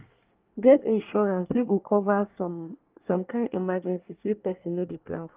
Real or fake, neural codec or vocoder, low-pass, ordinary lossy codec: fake; codec, 24 kHz, 1 kbps, SNAC; 3.6 kHz; Opus, 32 kbps